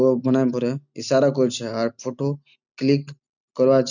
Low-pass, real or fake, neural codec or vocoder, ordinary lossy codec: 7.2 kHz; real; none; none